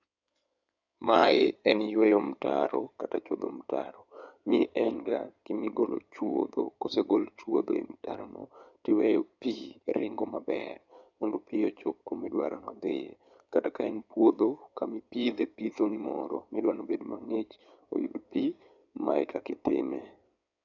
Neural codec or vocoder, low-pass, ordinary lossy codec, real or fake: codec, 16 kHz in and 24 kHz out, 2.2 kbps, FireRedTTS-2 codec; 7.2 kHz; none; fake